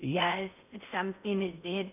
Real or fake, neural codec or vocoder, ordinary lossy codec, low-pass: fake; codec, 16 kHz in and 24 kHz out, 0.6 kbps, FocalCodec, streaming, 4096 codes; none; 3.6 kHz